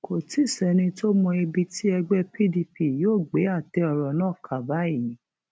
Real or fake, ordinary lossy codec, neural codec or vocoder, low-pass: real; none; none; none